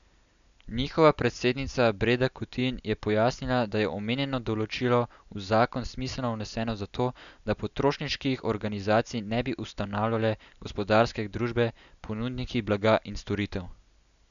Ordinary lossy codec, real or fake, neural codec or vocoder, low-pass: none; real; none; 7.2 kHz